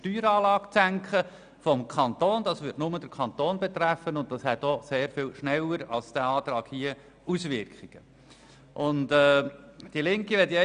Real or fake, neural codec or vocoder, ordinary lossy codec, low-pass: real; none; none; 9.9 kHz